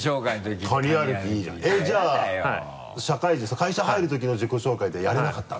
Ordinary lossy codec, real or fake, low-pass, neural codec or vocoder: none; real; none; none